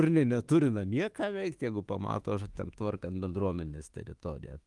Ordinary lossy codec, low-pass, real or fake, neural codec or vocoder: Opus, 24 kbps; 10.8 kHz; fake; autoencoder, 48 kHz, 32 numbers a frame, DAC-VAE, trained on Japanese speech